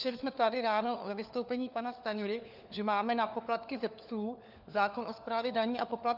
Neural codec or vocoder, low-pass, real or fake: codec, 16 kHz, 4 kbps, FunCodec, trained on LibriTTS, 50 frames a second; 5.4 kHz; fake